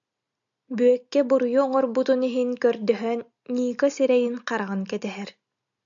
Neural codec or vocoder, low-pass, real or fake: none; 7.2 kHz; real